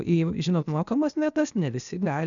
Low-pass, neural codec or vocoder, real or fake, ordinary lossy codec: 7.2 kHz; codec, 16 kHz, 0.8 kbps, ZipCodec; fake; MP3, 96 kbps